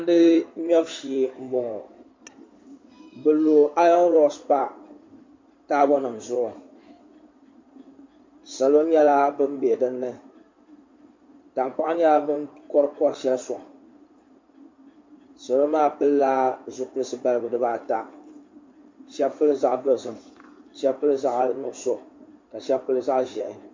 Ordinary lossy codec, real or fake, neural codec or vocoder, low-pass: MP3, 64 kbps; fake; codec, 16 kHz in and 24 kHz out, 2.2 kbps, FireRedTTS-2 codec; 7.2 kHz